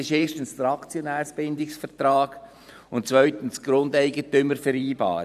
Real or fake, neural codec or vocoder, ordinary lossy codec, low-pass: fake; vocoder, 44.1 kHz, 128 mel bands every 512 samples, BigVGAN v2; none; 14.4 kHz